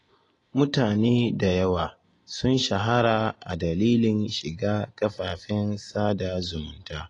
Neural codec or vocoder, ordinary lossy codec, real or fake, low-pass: none; AAC, 32 kbps; real; 10.8 kHz